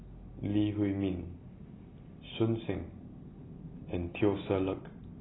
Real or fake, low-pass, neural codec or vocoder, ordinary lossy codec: real; 7.2 kHz; none; AAC, 16 kbps